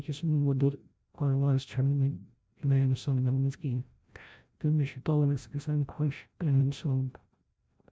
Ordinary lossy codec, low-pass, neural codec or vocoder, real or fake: none; none; codec, 16 kHz, 0.5 kbps, FreqCodec, larger model; fake